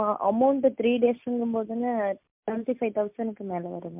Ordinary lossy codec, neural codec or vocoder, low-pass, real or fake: MP3, 32 kbps; none; 3.6 kHz; real